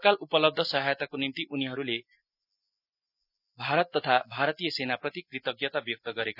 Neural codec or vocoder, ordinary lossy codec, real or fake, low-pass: none; none; real; 5.4 kHz